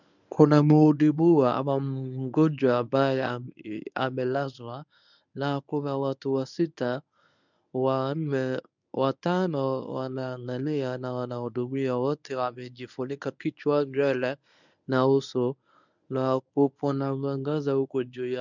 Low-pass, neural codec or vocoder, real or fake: 7.2 kHz; codec, 24 kHz, 0.9 kbps, WavTokenizer, medium speech release version 1; fake